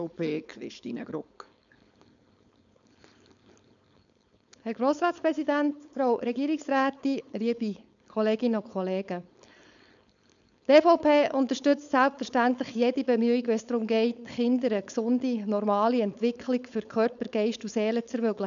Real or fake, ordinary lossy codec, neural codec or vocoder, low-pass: fake; none; codec, 16 kHz, 4.8 kbps, FACodec; 7.2 kHz